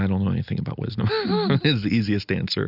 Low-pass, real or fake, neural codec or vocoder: 5.4 kHz; real; none